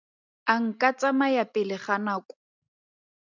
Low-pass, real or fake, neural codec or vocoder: 7.2 kHz; real; none